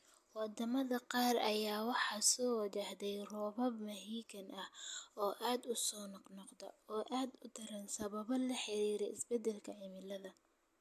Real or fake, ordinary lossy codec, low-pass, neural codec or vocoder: real; AAC, 96 kbps; 14.4 kHz; none